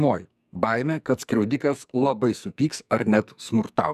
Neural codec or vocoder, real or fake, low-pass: codec, 44.1 kHz, 2.6 kbps, SNAC; fake; 14.4 kHz